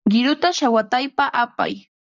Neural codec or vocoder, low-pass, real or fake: vocoder, 44.1 kHz, 128 mel bands, Pupu-Vocoder; 7.2 kHz; fake